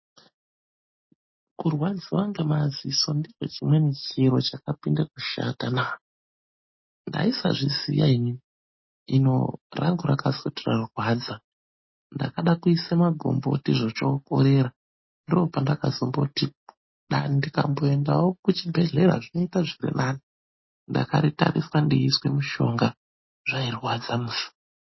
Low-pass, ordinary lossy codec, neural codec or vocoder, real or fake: 7.2 kHz; MP3, 24 kbps; none; real